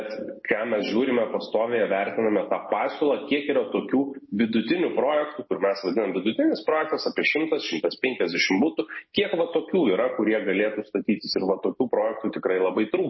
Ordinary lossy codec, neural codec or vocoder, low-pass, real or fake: MP3, 24 kbps; none; 7.2 kHz; real